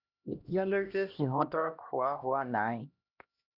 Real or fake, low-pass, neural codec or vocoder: fake; 5.4 kHz; codec, 16 kHz, 1 kbps, X-Codec, HuBERT features, trained on LibriSpeech